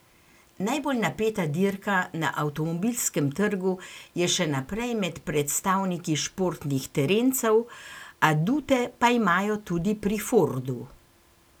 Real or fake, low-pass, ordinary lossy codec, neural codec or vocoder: real; none; none; none